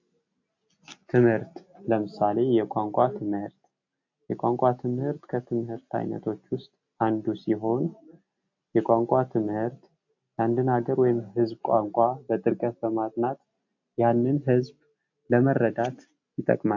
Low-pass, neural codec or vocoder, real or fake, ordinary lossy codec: 7.2 kHz; none; real; AAC, 48 kbps